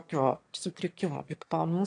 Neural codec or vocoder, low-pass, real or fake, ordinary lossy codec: autoencoder, 22.05 kHz, a latent of 192 numbers a frame, VITS, trained on one speaker; 9.9 kHz; fake; AAC, 48 kbps